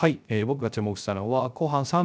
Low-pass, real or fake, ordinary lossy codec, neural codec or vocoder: none; fake; none; codec, 16 kHz, 0.3 kbps, FocalCodec